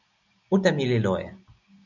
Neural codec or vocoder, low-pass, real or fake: none; 7.2 kHz; real